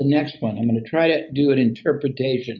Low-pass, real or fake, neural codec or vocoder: 7.2 kHz; real; none